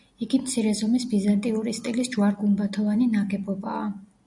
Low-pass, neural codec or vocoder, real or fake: 10.8 kHz; none; real